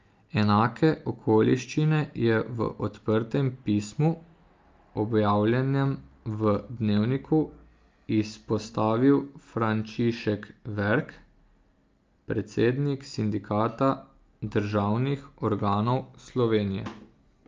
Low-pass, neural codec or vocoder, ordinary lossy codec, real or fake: 7.2 kHz; none; Opus, 24 kbps; real